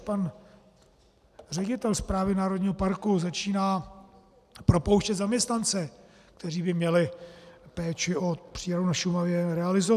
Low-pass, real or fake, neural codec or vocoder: 14.4 kHz; real; none